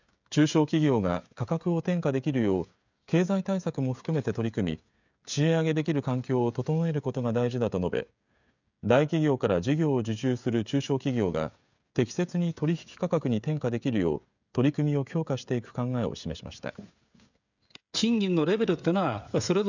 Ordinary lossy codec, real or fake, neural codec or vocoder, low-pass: none; fake; codec, 16 kHz, 16 kbps, FreqCodec, smaller model; 7.2 kHz